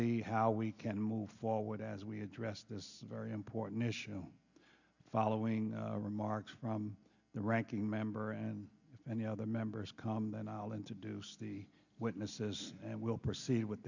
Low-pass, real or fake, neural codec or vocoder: 7.2 kHz; real; none